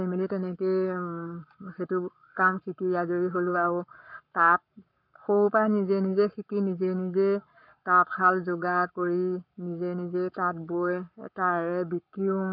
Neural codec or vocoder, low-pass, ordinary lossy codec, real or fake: codec, 44.1 kHz, 7.8 kbps, Pupu-Codec; 5.4 kHz; none; fake